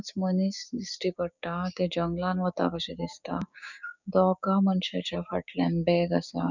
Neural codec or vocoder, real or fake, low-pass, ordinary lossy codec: codec, 16 kHz, 6 kbps, DAC; fake; 7.2 kHz; none